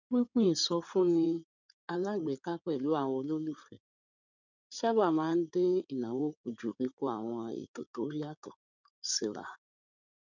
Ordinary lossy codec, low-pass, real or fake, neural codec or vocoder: none; 7.2 kHz; fake; codec, 16 kHz in and 24 kHz out, 2.2 kbps, FireRedTTS-2 codec